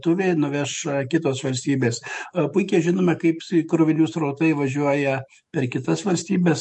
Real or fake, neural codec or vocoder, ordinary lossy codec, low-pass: fake; autoencoder, 48 kHz, 128 numbers a frame, DAC-VAE, trained on Japanese speech; MP3, 48 kbps; 14.4 kHz